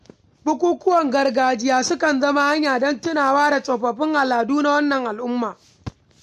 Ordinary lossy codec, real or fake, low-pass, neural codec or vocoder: MP3, 64 kbps; real; 14.4 kHz; none